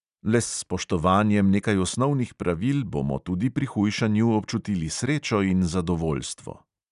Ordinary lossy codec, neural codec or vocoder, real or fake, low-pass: none; none; real; 10.8 kHz